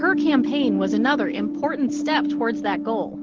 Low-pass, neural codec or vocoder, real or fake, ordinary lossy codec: 7.2 kHz; none; real; Opus, 16 kbps